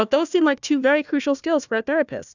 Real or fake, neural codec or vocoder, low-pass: fake; codec, 16 kHz, 1 kbps, FunCodec, trained on Chinese and English, 50 frames a second; 7.2 kHz